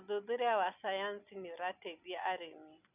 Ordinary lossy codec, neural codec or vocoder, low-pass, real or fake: none; none; 3.6 kHz; real